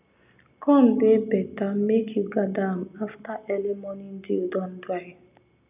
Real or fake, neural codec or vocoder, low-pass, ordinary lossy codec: real; none; 3.6 kHz; none